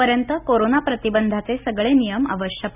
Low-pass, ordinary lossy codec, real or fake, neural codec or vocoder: 3.6 kHz; none; real; none